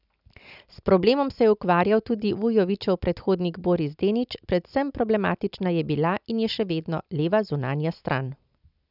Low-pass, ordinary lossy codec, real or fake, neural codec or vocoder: 5.4 kHz; none; real; none